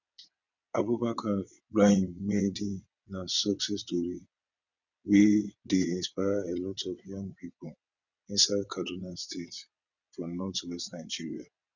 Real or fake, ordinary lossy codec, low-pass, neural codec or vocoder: fake; none; 7.2 kHz; vocoder, 22.05 kHz, 80 mel bands, WaveNeXt